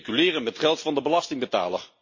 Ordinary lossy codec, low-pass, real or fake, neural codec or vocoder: MP3, 32 kbps; 7.2 kHz; real; none